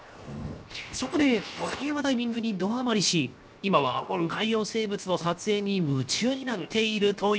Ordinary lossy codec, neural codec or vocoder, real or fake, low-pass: none; codec, 16 kHz, 0.7 kbps, FocalCodec; fake; none